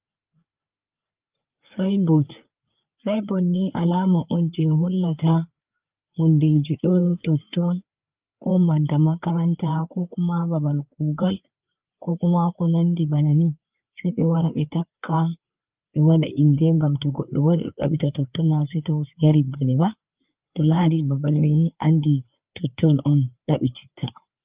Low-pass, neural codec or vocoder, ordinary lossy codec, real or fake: 3.6 kHz; codec, 16 kHz, 4 kbps, FreqCodec, larger model; Opus, 24 kbps; fake